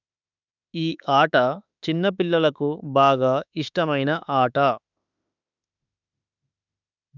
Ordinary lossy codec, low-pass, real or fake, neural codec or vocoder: none; 7.2 kHz; fake; autoencoder, 48 kHz, 32 numbers a frame, DAC-VAE, trained on Japanese speech